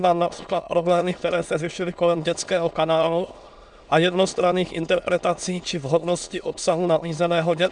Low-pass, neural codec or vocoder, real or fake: 9.9 kHz; autoencoder, 22.05 kHz, a latent of 192 numbers a frame, VITS, trained on many speakers; fake